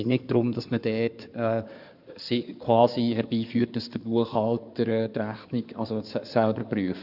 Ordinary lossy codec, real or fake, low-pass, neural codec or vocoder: none; fake; 5.4 kHz; codec, 16 kHz in and 24 kHz out, 2.2 kbps, FireRedTTS-2 codec